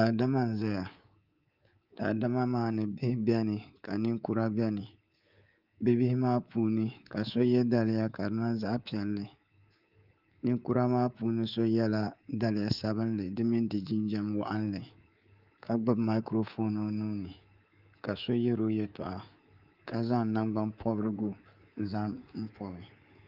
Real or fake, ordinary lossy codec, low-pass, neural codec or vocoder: fake; Opus, 32 kbps; 5.4 kHz; codec, 24 kHz, 3.1 kbps, DualCodec